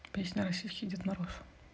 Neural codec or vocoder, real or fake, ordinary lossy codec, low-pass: none; real; none; none